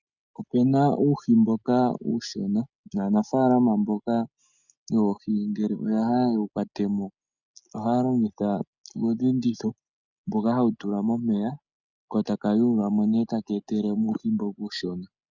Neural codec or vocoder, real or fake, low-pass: none; real; 7.2 kHz